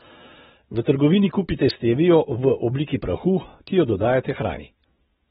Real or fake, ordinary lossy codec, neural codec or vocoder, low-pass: real; AAC, 16 kbps; none; 7.2 kHz